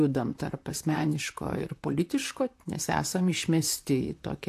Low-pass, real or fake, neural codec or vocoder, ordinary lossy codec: 14.4 kHz; fake; vocoder, 44.1 kHz, 128 mel bands, Pupu-Vocoder; AAC, 64 kbps